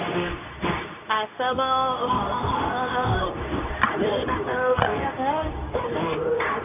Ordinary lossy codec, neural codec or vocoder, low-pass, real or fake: none; codec, 24 kHz, 0.9 kbps, WavTokenizer, medium speech release version 2; 3.6 kHz; fake